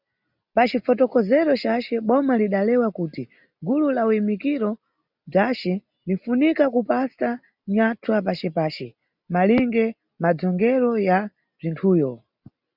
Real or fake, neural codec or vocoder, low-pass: real; none; 5.4 kHz